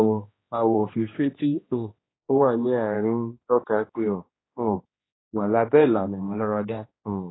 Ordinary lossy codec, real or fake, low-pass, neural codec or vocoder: AAC, 16 kbps; fake; 7.2 kHz; codec, 16 kHz, 1 kbps, X-Codec, HuBERT features, trained on general audio